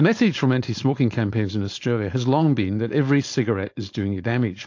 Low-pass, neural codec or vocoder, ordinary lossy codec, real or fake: 7.2 kHz; codec, 16 kHz, 4.8 kbps, FACodec; AAC, 48 kbps; fake